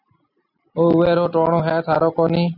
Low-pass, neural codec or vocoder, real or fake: 5.4 kHz; none; real